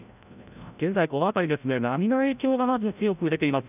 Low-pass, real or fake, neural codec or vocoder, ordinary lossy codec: 3.6 kHz; fake; codec, 16 kHz, 0.5 kbps, FreqCodec, larger model; none